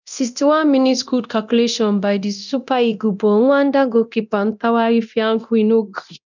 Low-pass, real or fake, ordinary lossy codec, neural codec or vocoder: 7.2 kHz; fake; none; codec, 24 kHz, 0.9 kbps, DualCodec